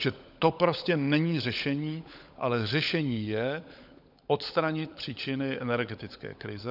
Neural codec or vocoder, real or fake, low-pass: codec, 16 kHz, 16 kbps, FunCodec, trained on LibriTTS, 50 frames a second; fake; 5.4 kHz